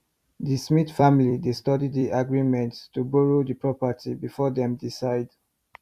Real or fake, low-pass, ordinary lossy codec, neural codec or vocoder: fake; 14.4 kHz; Opus, 64 kbps; vocoder, 44.1 kHz, 128 mel bands every 256 samples, BigVGAN v2